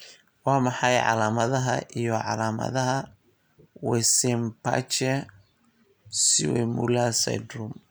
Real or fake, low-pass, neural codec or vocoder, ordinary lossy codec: real; none; none; none